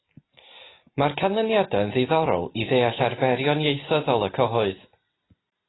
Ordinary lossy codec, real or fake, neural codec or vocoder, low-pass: AAC, 16 kbps; real; none; 7.2 kHz